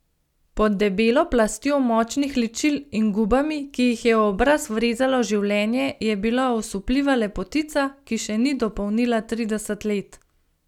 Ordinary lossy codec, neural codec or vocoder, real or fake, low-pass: none; none; real; 19.8 kHz